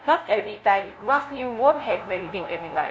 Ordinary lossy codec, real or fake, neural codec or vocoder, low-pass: none; fake; codec, 16 kHz, 0.5 kbps, FunCodec, trained on LibriTTS, 25 frames a second; none